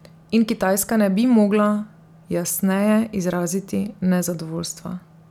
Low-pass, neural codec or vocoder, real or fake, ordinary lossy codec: 19.8 kHz; none; real; none